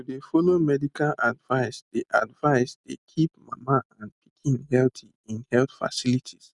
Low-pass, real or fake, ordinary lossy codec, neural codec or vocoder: 10.8 kHz; real; none; none